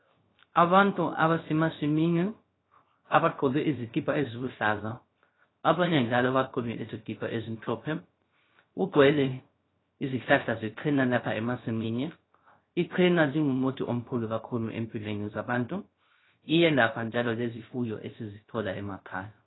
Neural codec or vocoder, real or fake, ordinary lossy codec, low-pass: codec, 16 kHz, 0.3 kbps, FocalCodec; fake; AAC, 16 kbps; 7.2 kHz